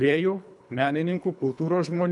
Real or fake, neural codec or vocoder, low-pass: fake; codec, 24 kHz, 3 kbps, HILCodec; 10.8 kHz